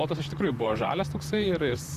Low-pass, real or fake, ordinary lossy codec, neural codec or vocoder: 14.4 kHz; fake; Opus, 64 kbps; vocoder, 44.1 kHz, 128 mel bands, Pupu-Vocoder